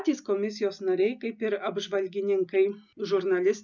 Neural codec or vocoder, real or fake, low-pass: none; real; 7.2 kHz